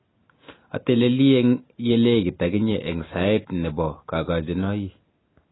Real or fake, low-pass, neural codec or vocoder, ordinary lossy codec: real; 7.2 kHz; none; AAC, 16 kbps